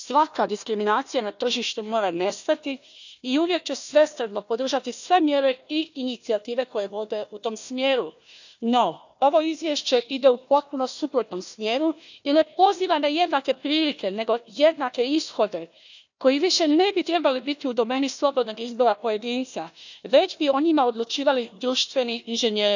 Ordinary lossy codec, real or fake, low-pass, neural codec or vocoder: none; fake; 7.2 kHz; codec, 16 kHz, 1 kbps, FunCodec, trained on Chinese and English, 50 frames a second